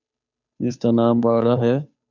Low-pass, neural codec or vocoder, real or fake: 7.2 kHz; codec, 16 kHz, 2 kbps, FunCodec, trained on Chinese and English, 25 frames a second; fake